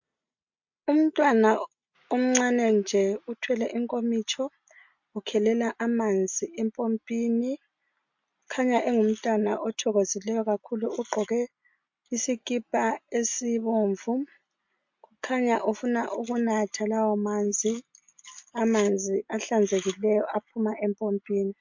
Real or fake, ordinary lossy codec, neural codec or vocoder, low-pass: real; MP3, 48 kbps; none; 7.2 kHz